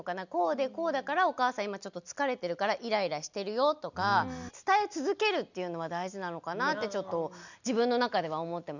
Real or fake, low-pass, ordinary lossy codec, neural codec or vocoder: real; 7.2 kHz; none; none